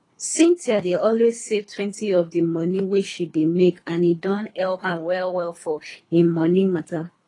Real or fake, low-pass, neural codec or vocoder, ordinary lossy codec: fake; 10.8 kHz; codec, 24 kHz, 3 kbps, HILCodec; AAC, 32 kbps